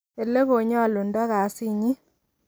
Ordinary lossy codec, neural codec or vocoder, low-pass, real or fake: none; none; none; real